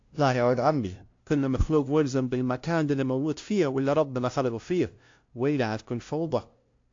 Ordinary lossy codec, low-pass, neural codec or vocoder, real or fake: AAC, 48 kbps; 7.2 kHz; codec, 16 kHz, 0.5 kbps, FunCodec, trained on LibriTTS, 25 frames a second; fake